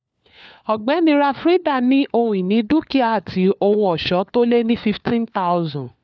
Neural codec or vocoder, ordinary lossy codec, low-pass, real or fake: codec, 16 kHz, 4 kbps, FunCodec, trained on LibriTTS, 50 frames a second; none; none; fake